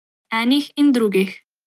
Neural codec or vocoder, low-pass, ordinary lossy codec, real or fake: none; 14.4 kHz; Opus, 32 kbps; real